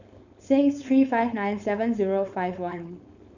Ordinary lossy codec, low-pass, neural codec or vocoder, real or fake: none; 7.2 kHz; codec, 16 kHz, 4.8 kbps, FACodec; fake